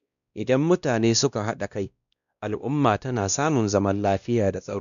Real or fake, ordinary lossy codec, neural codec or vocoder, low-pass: fake; none; codec, 16 kHz, 1 kbps, X-Codec, WavLM features, trained on Multilingual LibriSpeech; 7.2 kHz